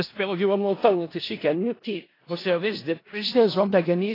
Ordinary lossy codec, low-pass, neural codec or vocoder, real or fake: AAC, 24 kbps; 5.4 kHz; codec, 16 kHz in and 24 kHz out, 0.4 kbps, LongCat-Audio-Codec, four codebook decoder; fake